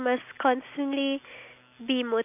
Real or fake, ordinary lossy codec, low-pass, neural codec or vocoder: real; none; 3.6 kHz; none